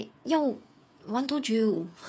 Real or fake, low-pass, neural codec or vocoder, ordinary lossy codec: fake; none; codec, 16 kHz, 8 kbps, FreqCodec, smaller model; none